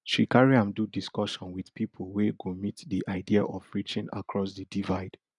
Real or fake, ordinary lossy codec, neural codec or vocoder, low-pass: real; none; none; 10.8 kHz